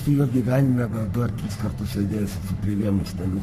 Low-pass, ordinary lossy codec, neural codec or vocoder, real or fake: 14.4 kHz; MP3, 96 kbps; codec, 44.1 kHz, 3.4 kbps, Pupu-Codec; fake